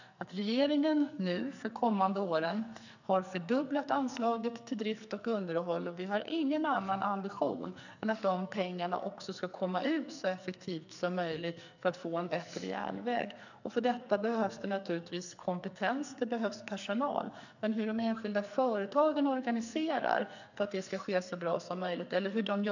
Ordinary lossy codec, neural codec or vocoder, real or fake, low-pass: none; codec, 44.1 kHz, 2.6 kbps, SNAC; fake; 7.2 kHz